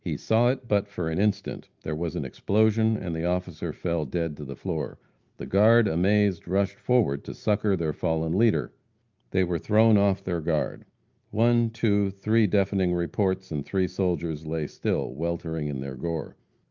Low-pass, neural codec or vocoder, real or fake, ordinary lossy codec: 7.2 kHz; none; real; Opus, 32 kbps